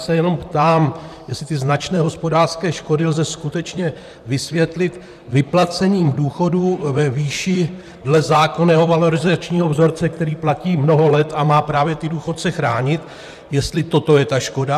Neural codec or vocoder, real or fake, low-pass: vocoder, 44.1 kHz, 128 mel bands, Pupu-Vocoder; fake; 14.4 kHz